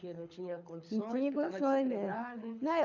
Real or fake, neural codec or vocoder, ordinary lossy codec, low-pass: fake; codec, 24 kHz, 6 kbps, HILCodec; none; 7.2 kHz